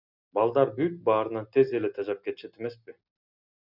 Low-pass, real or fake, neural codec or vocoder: 5.4 kHz; real; none